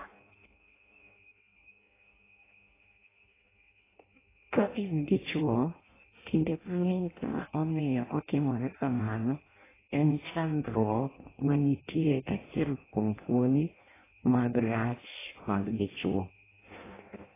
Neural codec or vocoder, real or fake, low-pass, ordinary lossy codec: codec, 16 kHz in and 24 kHz out, 0.6 kbps, FireRedTTS-2 codec; fake; 3.6 kHz; AAC, 16 kbps